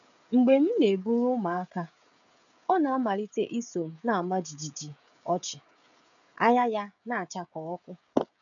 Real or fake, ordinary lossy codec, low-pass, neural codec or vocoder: fake; none; 7.2 kHz; codec, 16 kHz, 16 kbps, FreqCodec, smaller model